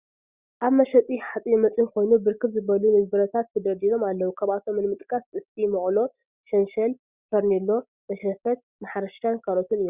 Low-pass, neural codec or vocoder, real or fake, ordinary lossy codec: 3.6 kHz; none; real; Opus, 64 kbps